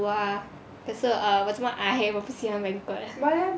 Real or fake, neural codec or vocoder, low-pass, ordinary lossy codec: real; none; none; none